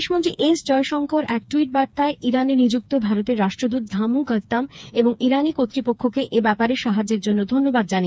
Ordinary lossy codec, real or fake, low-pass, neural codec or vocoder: none; fake; none; codec, 16 kHz, 4 kbps, FreqCodec, smaller model